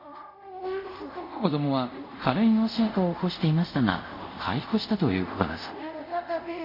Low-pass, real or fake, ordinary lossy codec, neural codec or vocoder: 5.4 kHz; fake; MP3, 48 kbps; codec, 24 kHz, 0.5 kbps, DualCodec